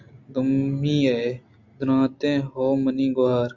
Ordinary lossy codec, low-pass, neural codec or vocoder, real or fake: Opus, 64 kbps; 7.2 kHz; none; real